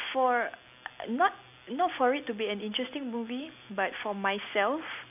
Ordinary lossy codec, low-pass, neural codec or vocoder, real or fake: none; 3.6 kHz; none; real